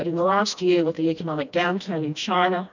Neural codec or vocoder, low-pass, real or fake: codec, 16 kHz, 1 kbps, FreqCodec, smaller model; 7.2 kHz; fake